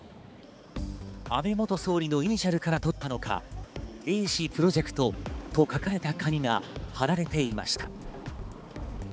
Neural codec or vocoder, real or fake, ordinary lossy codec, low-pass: codec, 16 kHz, 4 kbps, X-Codec, HuBERT features, trained on balanced general audio; fake; none; none